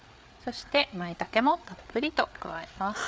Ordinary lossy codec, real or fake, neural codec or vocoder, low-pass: none; fake; codec, 16 kHz, 8 kbps, FreqCodec, larger model; none